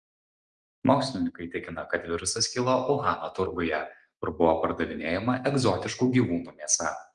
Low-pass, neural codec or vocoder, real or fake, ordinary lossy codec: 9.9 kHz; none; real; Opus, 24 kbps